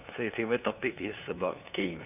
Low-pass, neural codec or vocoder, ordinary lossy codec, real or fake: 3.6 kHz; codec, 16 kHz, 2 kbps, FunCodec, trained on LibriTTS, 25 frames a second; none; fake